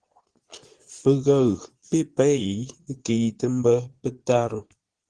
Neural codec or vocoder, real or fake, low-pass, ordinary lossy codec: vocoder, 22.05 kHz, 80 mel bands, Vocos; fake; 9.9 kHz; Opus, 16 kbps